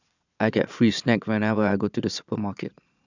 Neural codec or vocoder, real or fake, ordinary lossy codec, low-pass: vocoder, 22.05 kHz, 80 mel bands, Vocos; fake; none; 7.2 kHz